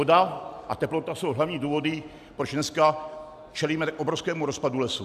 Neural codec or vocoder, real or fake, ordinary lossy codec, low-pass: none; real; AAC, 96 kbps; 14.4 kHz